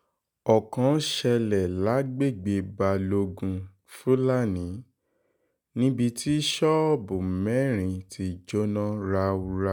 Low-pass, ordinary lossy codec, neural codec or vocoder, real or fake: none; none; none; real